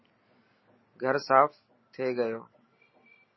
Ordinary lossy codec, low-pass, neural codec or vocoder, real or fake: MP3, 24 kbps; 7.2 kHz; none; real